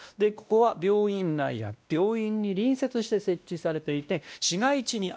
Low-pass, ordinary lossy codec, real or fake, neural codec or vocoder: none; none; fake; codec, 16 kHz, 1 kbps, X-Codec, WavLM features, trained on Multilingual LibriSpeech